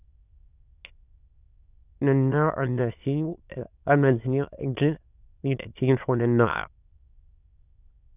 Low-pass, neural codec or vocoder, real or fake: 3.6 kHz; autoencoder, 22.05 kHz, a latent of 192 numbers a frame, VITS, trained on many speakers; fake